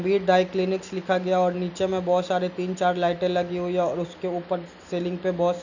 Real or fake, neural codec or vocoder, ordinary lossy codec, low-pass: real; none; none; 7.2 kHz